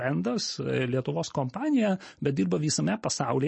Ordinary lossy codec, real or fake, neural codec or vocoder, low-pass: MP3, 32 kbps; real; none; 10.8 kHz